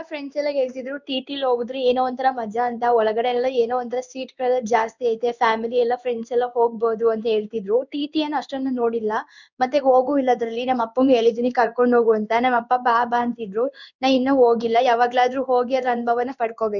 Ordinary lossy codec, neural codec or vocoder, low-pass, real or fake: none; codec, 16 kHz in and 24 kHz out, 1 kbps, XY-Tokenizer; 7.2 kHz; fake